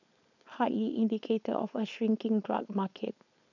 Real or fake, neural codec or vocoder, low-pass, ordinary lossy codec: fake; codec, 16 kHz, 4.8 kbps, FACodec; 7.2 kHz; none